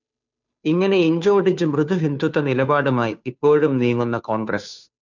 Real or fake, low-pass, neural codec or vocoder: fake; 7.2 kHz; codec, 16 kHz, 2 kbps, FunCodec, trained on Chinese and English, 25 frames a second